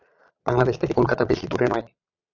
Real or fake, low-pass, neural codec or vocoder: fake; 7.2 kHz; vocoder, 22.05 kHz, 80 mel bands, Vocos